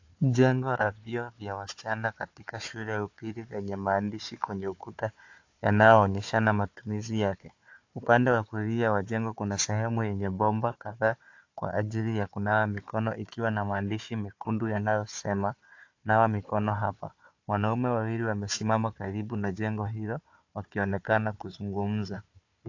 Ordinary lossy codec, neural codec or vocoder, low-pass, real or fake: AAC, 48 kbps; codec, 16 kHz, 4 kbps, FunCodec, trained on Chinese and English, 50 frames a second; 7.2 kHz; fake